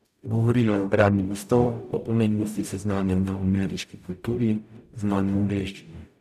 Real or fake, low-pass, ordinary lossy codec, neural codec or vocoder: fake; 14.4 kHz; none; codec, 44.1 kHz, 0.9 kbps, DAC